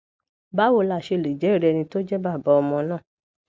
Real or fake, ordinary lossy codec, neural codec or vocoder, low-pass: real; none; none; none